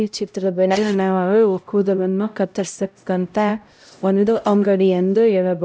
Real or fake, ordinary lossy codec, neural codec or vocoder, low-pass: fake; none; codec, 16 kHz, 0.5 kbps, X-Codec, HuBERT features, trained on LibriSpeech; none